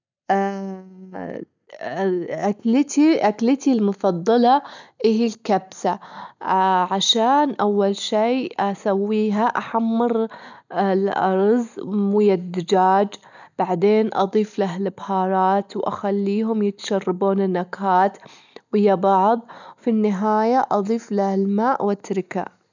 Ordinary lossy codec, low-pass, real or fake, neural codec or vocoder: none; 7.2 kHz; real; none